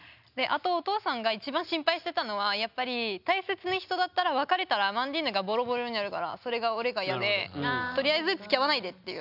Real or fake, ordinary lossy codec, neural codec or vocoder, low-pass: real; none; none; 5.4 kHz